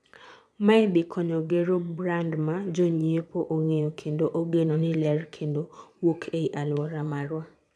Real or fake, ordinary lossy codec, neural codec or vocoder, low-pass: fake; none; vocoder, 22.05 kHz, 80 mel bands, Vocos; none